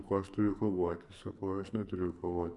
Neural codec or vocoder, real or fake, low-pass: codec, 44.1 kHz, 2.6 kbps, SNAC; fake; 10.8 kHz